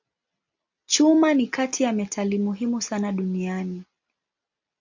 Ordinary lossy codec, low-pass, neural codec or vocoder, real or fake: MP3, 64 kbps; 7.2 kHz; none; real